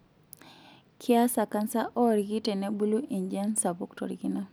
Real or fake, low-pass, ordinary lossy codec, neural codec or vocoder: real; none; none; none